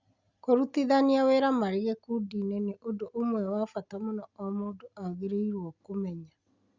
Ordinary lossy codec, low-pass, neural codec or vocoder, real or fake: Opus, 64 kbps; 7.2 kHz; none; real